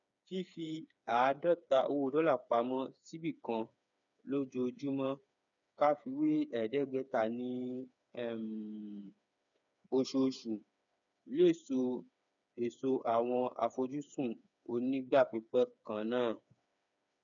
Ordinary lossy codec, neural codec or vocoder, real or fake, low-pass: none; codec, 16 kHz, 4 kbps, FreqCodec, smaller model; fake; 7.2 kHz